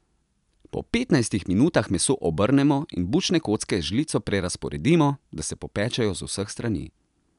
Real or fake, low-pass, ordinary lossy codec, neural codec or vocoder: real; 10.8 kHz; none; none